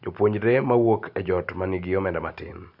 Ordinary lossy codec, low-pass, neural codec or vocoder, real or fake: Opus, 64 kbps; 5.4 kHz; none; real